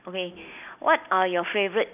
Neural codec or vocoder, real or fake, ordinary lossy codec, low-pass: none; real; none; 3.6 kHz